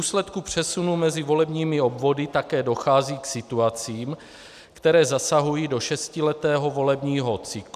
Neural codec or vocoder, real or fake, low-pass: none; real; 14.4 kHz